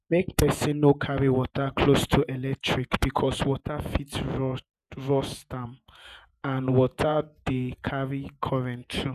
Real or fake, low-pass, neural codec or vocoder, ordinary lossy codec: fake; 14.4 kHz; vocoder, 48 kHz, 128 mel bands, Vocos; none